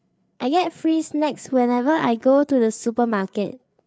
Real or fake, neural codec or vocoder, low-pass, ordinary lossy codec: fake; codec, 16 kHz, 4 kbps, FreqCodec, larger model; none; none